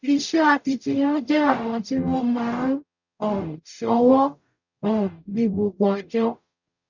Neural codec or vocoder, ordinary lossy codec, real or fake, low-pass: codec, 44.1 kHz, 0.9 kbps, DAC; none; fake; 7.2 kHz